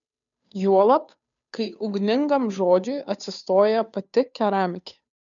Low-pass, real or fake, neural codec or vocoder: 7.2 kHz; fake; codec, 16 kHz, 2 kbps, FunCodec, trained on Chinese and English, 25 frames a second